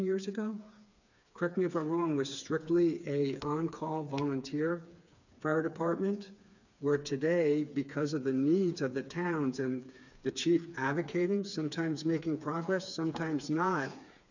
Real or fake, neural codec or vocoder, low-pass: fake; codec, 16 kHz, 4 kbps, FreqCodec, smaller model; 7.2 kHz